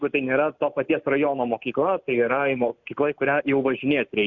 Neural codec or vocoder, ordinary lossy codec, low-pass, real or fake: none; MP3, 64 kbps; 7.2 kHz; real